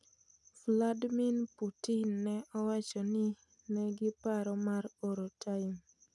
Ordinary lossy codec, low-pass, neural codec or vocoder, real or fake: none; none; none; real